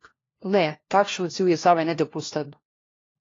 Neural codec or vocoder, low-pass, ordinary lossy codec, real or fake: codec, 16 kHz, 1 kbps, FunCodec, trained on LibriTTS, 50 frames a second; 7.2 kHz; AAC, 32 kbps; fake